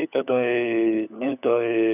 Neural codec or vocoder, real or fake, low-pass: codec, 16 kHz, 16 kbps, FunCodec, trained on LibriTTS, 50 frames a second; fake; 3.6 kHz